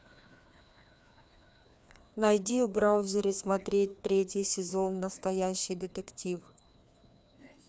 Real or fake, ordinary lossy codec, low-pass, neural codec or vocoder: fake; none; none; codec, 16 kHz, 2 kbps, FreqCodec, larger model